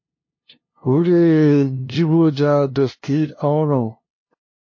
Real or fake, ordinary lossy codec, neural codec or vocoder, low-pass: fake; MP3, 32 kbps; codec, 16 kHz, 0.5 kbps, FunCodec, trained on LibriTTS, 25 frames a second; 7.2 kHz